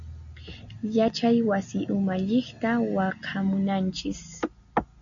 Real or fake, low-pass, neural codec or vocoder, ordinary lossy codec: real; 7.2 kHz; none; MP3, 96 kbps